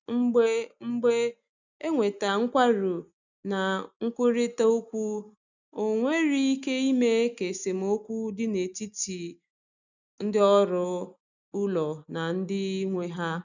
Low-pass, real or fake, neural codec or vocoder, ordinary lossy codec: 7.2 kHz; real; none; none